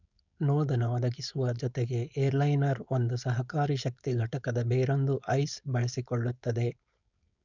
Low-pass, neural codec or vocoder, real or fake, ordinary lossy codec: 7.2 kHz; codec, 16 kHz, 4.8 kbps, FACodec; fake; none